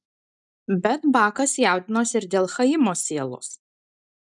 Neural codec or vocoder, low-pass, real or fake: none; 10.8 kHz; real